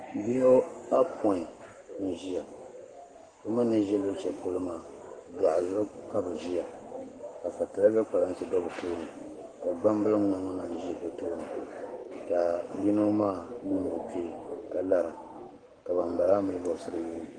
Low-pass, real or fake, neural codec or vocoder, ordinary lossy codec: 9.9 kHz; fake; codec, 44.1 kHz, 7.8 kbps, Pupu-Codec; Opus, 24 kbps